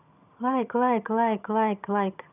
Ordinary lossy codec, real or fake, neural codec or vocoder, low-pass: none; fake; vocoder, 22.05 kHz, 80 mel bands, HiFi-GAN; 3.6 kHz